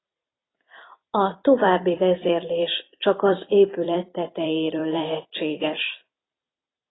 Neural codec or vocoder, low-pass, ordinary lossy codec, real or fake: vocoder, 44.1 kHz, 128 mel bands every 256 samples, BigVGAN v2; 7.2 kHz; AAC, 16 kbps; fake